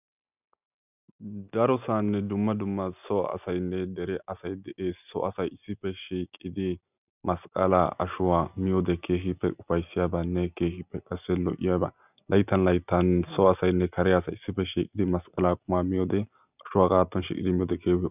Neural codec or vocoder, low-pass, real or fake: none; 3.6 kHz; real